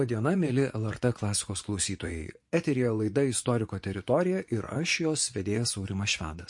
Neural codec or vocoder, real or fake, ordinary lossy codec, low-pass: vocoder, 24 kHz, 100 mel bands, Vocos; fake; MP3, 48 kbps; 10.8 kHz